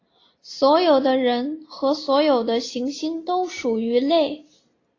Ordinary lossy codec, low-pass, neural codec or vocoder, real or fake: AAC, 32 kbps; 7.2 kHz; none; real